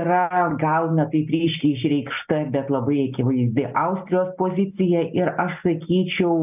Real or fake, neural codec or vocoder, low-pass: real; none; 3.6 kHz